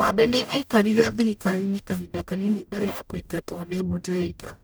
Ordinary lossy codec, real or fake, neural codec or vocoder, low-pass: none; fake; codec, 44.1 kHz, 0.9 kbps, DAC; none